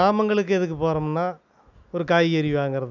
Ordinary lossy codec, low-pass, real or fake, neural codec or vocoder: none; 7.2 kHz; real; none